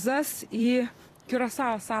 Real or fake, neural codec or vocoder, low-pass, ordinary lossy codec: fake; vocoder, 44.1 kHz, 128 mel bands every 256 samples, BigVGAN v2; 14.4 kHz; AAC, 48 kbps